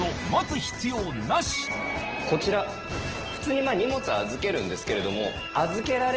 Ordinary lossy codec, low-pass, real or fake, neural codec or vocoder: Opus, 16 kbps; 7.2 kHz; real; none